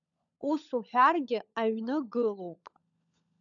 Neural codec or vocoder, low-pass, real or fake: codec, 16 kHz, 16 kbps, FunCodec, trained on LibriTTS, 50 frames a second; 7.2 kHz; fake